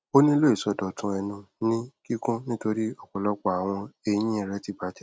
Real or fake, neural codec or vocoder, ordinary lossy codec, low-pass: real; none; none; none